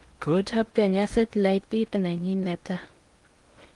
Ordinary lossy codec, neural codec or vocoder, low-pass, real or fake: Opus, 24 kbps; codec, 16 kHz in and 24 kHz out, 0.8 kbps, FocalCodec, streaming, 65536 codes; 10.8 kHz; fake